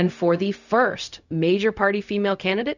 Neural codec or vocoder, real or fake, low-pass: codec, 16 kHz, 0.4 kbps, LongCat-Audio-Codec; fake; 7.2 kHz